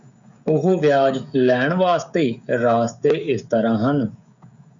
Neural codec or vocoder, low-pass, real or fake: codec, 16 kHz, 16 kbps, FreqCodec, smaller model; 7.2 kHz; fake